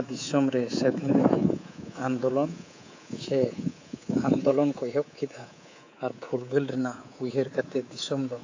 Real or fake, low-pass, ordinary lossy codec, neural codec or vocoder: fake; 7.2 kHz; AAC, 48 kbps; codec, 24 kHz, 3.1 kbps, DualCodec